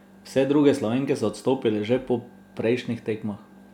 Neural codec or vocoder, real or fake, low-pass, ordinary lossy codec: none; real; 19.8 kHz; none